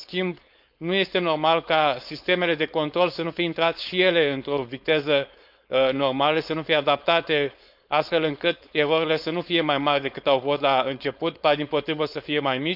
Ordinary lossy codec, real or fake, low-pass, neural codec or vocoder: none; fake; 5.4 kHz; codec, 16 kHz, 4.8 kbps, FACodec